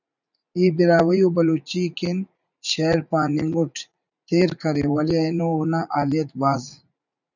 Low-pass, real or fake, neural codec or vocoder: 7.2 kHz; fake; vocoder, 44.1 kHz, 80 mel bands, Vocos